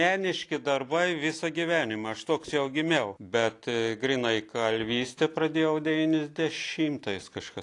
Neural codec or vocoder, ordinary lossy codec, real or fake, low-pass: none; AAC, 48 kbps; real; 10.8 kHz